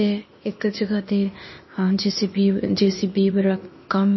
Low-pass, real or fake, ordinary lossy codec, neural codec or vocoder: 7.2 kHz; fake; MP3, 24 kbps; codec, 16 kHz, 0.8 kbps, ZipCodec